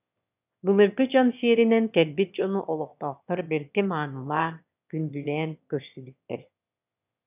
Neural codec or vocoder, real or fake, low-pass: autoencoder, 22.05 kHz, a latent of 192 numbers a frame, VITS, trained on one speaker; fake; 3.6 kHz